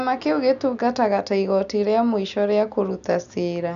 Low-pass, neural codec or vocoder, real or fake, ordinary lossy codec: 7.2 kHz; none; real; none